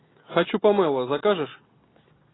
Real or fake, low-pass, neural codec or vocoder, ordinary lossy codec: real; 7.2 kHz; none; AAC, 16 kbps